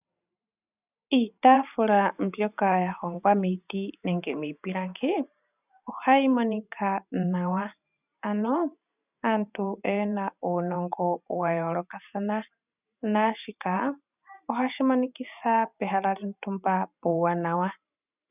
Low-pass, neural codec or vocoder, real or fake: 3.6 kHz; vocoder, 44.1 kHz, 128 mel bands every 512 samples, BigVGAN v2; fake